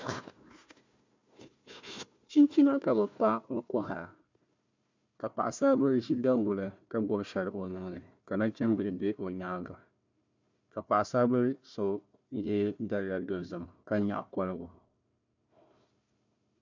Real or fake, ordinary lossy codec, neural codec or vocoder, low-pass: fake; MP3, 64 kbps; codec, 16 kHz, 1 kbps, FunCodec, trained on Chinese and English, 50 frames a second; 7.2 kHz